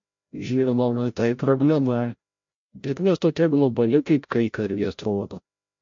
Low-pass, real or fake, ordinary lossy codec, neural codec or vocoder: 7.2 kHz; fake; MP3, 48 kbps; codec, 16 kHz, 0.5 kbps, FreqCodec, larger model